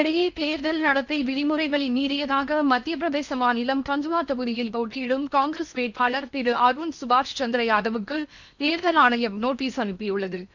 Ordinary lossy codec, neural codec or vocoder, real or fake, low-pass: none; codec, 16 kHz in and 24 kHz out, 0.8 kbps, FocalCodec, streaming, 65536 codes; fake; 7.2 kHz